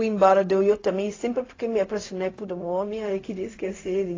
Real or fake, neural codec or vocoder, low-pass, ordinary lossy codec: fake; codec, 16 kHz, 0.4 kbps, LongCat-Audio-Codec; 7.2 kHz; AAC, 32 kbps